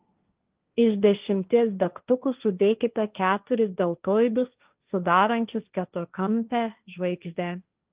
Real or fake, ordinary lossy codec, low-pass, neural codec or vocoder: fake; Opus, 24 kbps; 3.6 kHz; codec, 16 kHz, 1.1 kbps, Voila-Tokenizer